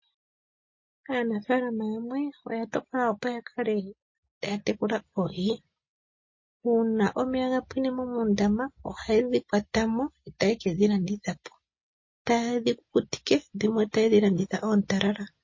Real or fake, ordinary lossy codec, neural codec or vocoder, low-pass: real; MP3, 32 kbps; none; 7.2 kHz